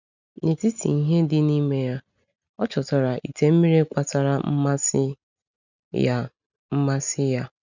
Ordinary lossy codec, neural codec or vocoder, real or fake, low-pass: none; none; real; 7.2 kHz